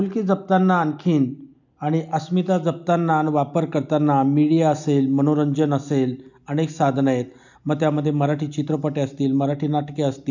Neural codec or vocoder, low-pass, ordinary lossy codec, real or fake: none; 7.2 kHz; none; real